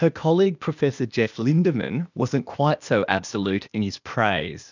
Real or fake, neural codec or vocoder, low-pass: fake; codec, 16 kHz, 0.8 kbps, ZipCodec; 7.2 kHz